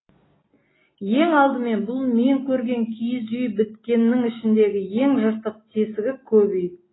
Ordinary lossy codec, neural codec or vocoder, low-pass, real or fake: AAC, 16 kbps; none; 7.2 kHz; real